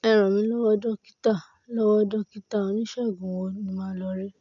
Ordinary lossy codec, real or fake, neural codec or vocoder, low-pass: none; real; none; 7.2 kHz